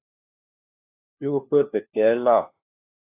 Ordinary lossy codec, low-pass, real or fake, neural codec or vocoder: AAC, 32 kbps; 3.6 kHz; fake; codec, 16 kHz, 1 kbps, FunCodec, trained on LibriTTS, 50 frames a second